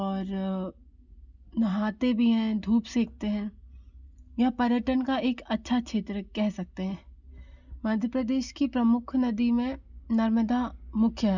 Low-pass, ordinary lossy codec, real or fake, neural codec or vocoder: 7.2 kHz; none; real; none